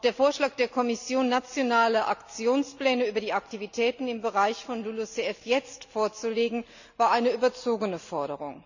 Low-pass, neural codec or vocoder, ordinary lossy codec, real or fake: 7.2 kHz; none; none; real